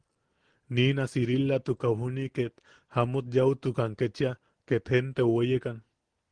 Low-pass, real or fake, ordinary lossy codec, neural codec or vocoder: 9.9 kHz; real; Opus, 16 kbps; none